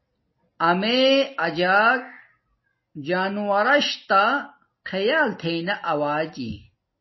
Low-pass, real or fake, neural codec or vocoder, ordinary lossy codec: 7.2 kHz; real; none; MP3, 24 kbps